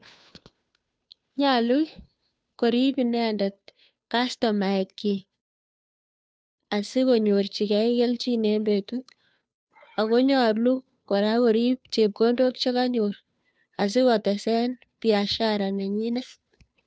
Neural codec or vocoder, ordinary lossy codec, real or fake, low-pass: codec, 16 kHz, 2 kbps, FunCodec, trained on Chinese and English, 25 frames a second; none; fake; none